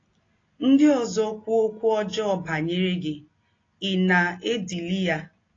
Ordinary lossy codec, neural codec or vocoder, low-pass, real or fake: AAC, 32 kbps; none; 7.2 kHz; real